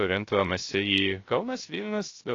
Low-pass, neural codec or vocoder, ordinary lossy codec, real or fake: 7.2 kHz; codec, 16 kHz, 0.3 kbps, FocalCodec; AAC, 32 kbps; fake